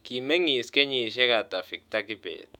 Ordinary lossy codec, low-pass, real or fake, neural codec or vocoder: none; 19.8 kHz; fake; autoencoder, 48 kHz, 128 numbers a frame, DAC-VAE, trained on Japanese speech